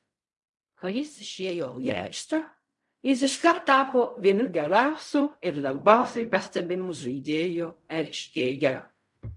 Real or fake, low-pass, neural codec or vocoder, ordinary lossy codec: fake; 10.8 kHz; codec, 16 kHz in and 24 kHz out, 0.4 kbps, LongCat-Audio-Codec, fine tuned four codebook decoder; MP3, 64 kbps